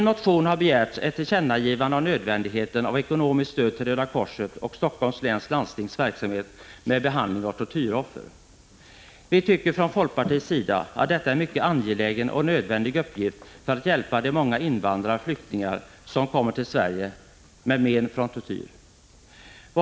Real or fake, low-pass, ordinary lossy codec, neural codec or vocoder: real; none; none; none